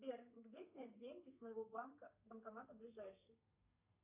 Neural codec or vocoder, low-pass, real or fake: codec, 32 kHz, 1.9 kbps, SNAC; 3.6 kHz; fake